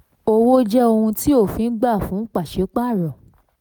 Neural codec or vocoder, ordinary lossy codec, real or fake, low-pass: none; none; real; none